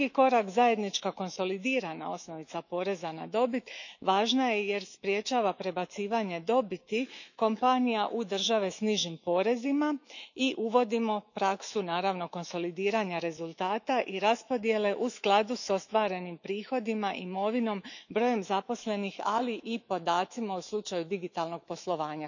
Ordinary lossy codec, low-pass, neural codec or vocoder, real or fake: none; 7.2 kHz; autoencoder, 48 kHz, 128 numbers a frame, DAC-VAE, trained on Japanese speech; fake